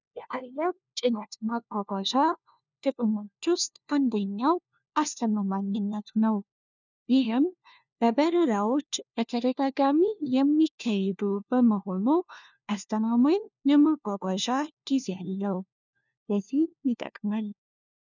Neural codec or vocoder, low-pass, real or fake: codec, 16 kHz, 1 kbps, FunCodec, trained on LibriTTS, 50 frames a second; 7.2 kHz; fake